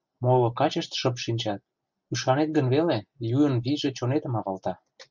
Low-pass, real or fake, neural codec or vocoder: 7.2 kHz; real; none